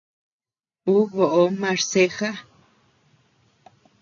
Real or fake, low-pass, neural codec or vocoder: real; 7.2 kHz; none